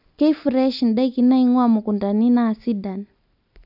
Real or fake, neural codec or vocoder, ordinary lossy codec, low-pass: real; none; none; 5.4 kHz